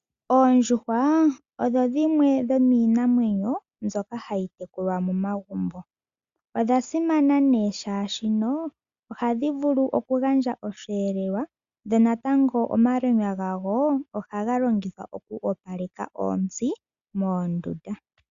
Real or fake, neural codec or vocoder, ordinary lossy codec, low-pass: real; none; Opus, 64 kbps; 7.2 kHz